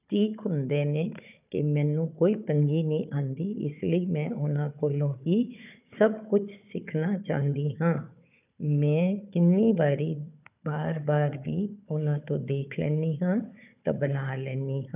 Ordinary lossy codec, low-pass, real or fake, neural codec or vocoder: none; 3.6 kHz; fake; codec, 16 kHz, 4 kbps, FunCodec, trained on Chinese and English, 50 frames a second